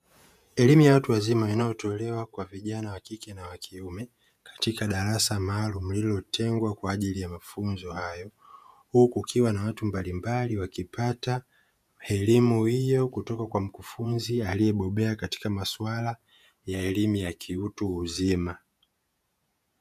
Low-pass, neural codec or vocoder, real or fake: 14.4 kHz; vocoder, 48 kHz, 128 mel bands, Vocos; fake